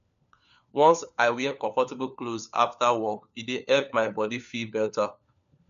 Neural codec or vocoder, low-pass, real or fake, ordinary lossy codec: codec, 16 kHz, 4 kbps, FunCodec, trained on LibriTTS, 50 frames a second; 7.2 kHz; fake; none